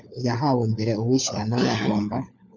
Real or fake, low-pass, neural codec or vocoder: fake; 7.2 kHz; codec, 16 kHz, 4 kbps, FunCodec, trained on LibriTTS, 50 frames a second